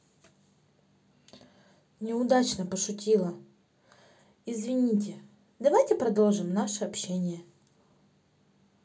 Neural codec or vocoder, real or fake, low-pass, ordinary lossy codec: none; real; none; none